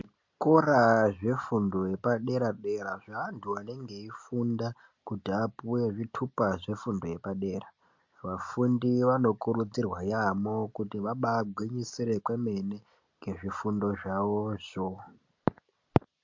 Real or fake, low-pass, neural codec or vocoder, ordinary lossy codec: real; 7.2 kHz; none; MP3, 48 kbps